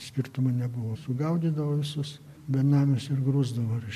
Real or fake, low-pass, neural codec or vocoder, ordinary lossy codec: real; 14.4 kHz; none; MP3, 64 kbps